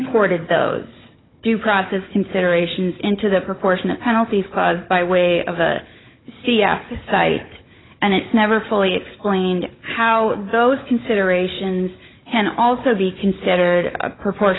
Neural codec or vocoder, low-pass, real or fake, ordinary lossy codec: codec, 16 kHz, 16 kbps, FunCodec, trained on Chinese and English, 50 frames a second; 7.2 kHz; fake; AAC, 16 kbps